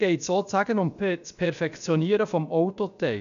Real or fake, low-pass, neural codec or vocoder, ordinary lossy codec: fake; 7.2 kHz; codec, 16 kHz, about 1 kbps, DyCAST, with the encoder's durations; none